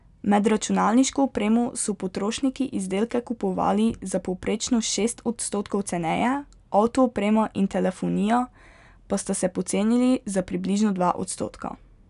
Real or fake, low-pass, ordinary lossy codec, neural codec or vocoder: real; 10.8 kHz; none; none